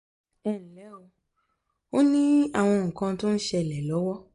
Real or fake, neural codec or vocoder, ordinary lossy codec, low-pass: real; none; MP3, 64 kbps; 10.8 kHz